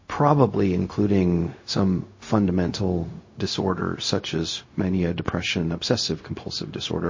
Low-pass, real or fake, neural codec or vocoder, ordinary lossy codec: 7.2 kHz; fake; codec, 16 kHz, 0.4 kbps, LongCat-Audio-Codec; MP3, 32 kbps